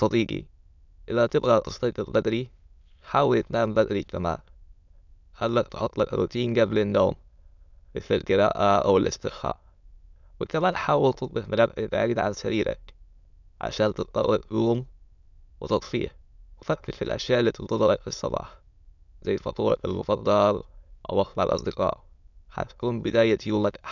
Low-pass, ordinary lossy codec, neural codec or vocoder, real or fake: 7.2 kHz; none; autoencoder, 22.05 kHz, a latent of 192 numbers a frame, VITS, trained on many speakers; fake